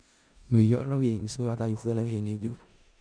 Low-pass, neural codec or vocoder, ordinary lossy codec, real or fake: 9.9 kHz; codec, 16 kHz in and 24 kHz out, 0.4 kbps, LongCat-Audio-Codec, four codebook decoder; none; fake